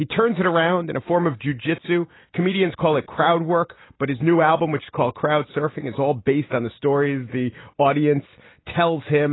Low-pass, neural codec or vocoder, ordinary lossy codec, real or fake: 7.2 kHz; none; AAC, 16 kbps; real